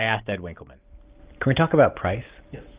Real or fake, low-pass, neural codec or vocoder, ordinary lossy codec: real; 3.6 kHz; none; Opus, 32 kbps